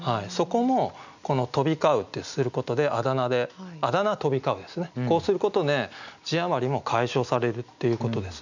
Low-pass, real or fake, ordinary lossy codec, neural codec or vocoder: 7.2 kHz; real; none; none